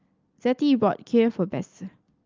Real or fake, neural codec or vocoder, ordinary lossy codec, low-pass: real; none; Opus, 24 kbps; 7.2 kHz